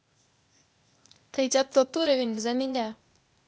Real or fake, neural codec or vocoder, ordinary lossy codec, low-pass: fake; codec, 16 kHz, 0.8 kbps, ZipCodec; none; none